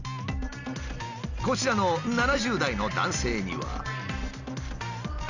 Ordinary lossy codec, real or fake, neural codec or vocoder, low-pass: none; real; none; 7.2 kHz